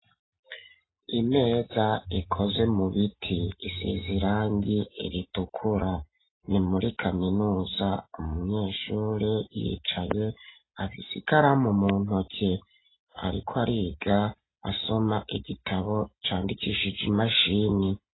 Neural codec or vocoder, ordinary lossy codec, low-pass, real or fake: none; AAC, 16 kbps; 7.2 kHz; real